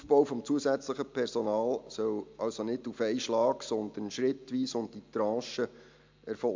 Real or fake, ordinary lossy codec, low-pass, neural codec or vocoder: real; MP3, 64 kbps; 7.2 kHz; none